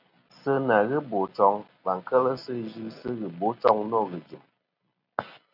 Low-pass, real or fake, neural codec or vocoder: 5.4 kHz; real; none